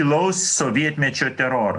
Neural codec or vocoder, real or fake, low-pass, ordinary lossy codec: none; real; 10.8 kHz; AAC, 64 kbps